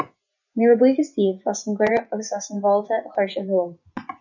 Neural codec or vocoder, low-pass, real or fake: vocoder, 24 kHz, 100 mel bands, Vocos; 7.2 kHz; fake